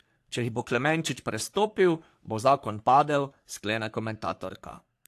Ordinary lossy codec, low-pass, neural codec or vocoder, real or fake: AAC, 64 kbps; 14.4 kHz; codec, 44.1 kHz, 3.4 kbps, Pupu-Codec; fake